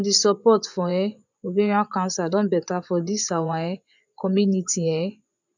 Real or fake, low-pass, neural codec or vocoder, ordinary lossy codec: fake; 7.2 kHz; vocoder, 24 kHz, 100 mel bands, Vocos; none